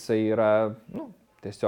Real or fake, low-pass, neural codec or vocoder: real; 19.8 kHz; none